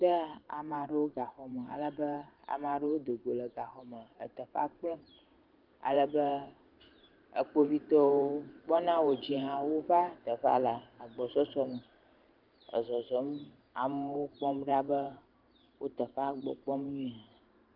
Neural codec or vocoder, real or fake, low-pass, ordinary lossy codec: vocoder, 44.1 kHz, 128 mel bands every 512 samples, BigVGAN v2; fake; 5.4 kHz; Opus, 32 kbps